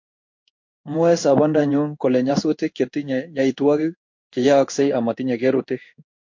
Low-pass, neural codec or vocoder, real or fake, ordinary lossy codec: 7.2 kHz; codec, 16 kHz in and 24 kHz out, 1 kbps, XY-Tokenizer; fake; MP3, 48 kbps